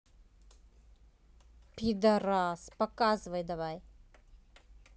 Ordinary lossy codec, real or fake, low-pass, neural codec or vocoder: none; real; none; none